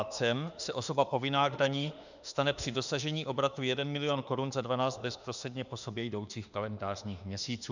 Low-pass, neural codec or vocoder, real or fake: 7.2 kHz; autoencoder, 48 kHz, 32 numbers a frame, DAC-VAE, trained on Japanese speech; fake